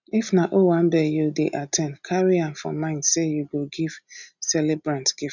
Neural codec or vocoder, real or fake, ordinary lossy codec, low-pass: none; real; none; 7.2 kHz